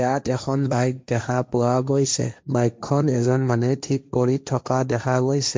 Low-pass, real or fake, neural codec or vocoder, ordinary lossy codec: none; fake; codec, 16 kHz, 1.1 kbps, Voila-Tokenizer; none